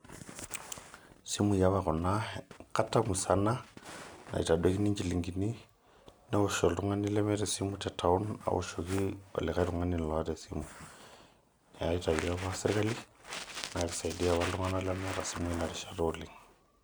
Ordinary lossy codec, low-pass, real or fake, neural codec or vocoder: none; none; real; none